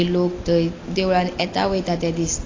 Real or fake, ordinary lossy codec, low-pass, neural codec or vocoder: real; AAC, 32 kbps; 7.2 kHz; none